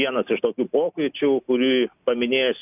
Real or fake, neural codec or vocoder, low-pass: real; none; 3.6 kHz